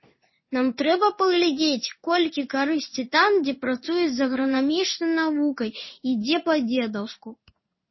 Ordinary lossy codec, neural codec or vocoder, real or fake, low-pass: MP3, 24 kbps; codec, 16 kHz in and 24 kHz out, 1 kbps, XY-Tokenizer; fake; 7.2 kHz